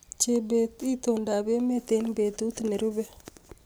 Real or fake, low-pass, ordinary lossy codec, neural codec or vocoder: real; none; none; none